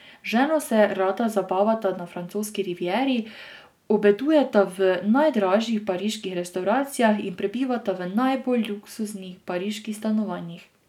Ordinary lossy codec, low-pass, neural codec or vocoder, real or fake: none; 19.8 kHz; none; real